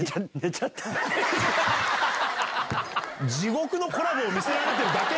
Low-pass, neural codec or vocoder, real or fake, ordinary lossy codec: none; none; real; none